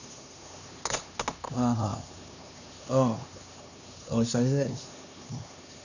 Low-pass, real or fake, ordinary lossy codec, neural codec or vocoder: 7.2 kHz; fake; none; codec, 16 kHz, 2 kbps, X-Codec, HuBERT features, trained on LibriSpeech